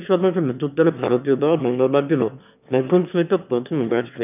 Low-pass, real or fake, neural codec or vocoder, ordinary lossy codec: 3.6 kHz; fake; autoencoder, 22.05 kHz, a latent of 192 numbers a frame, VITS, trained on one speaker; none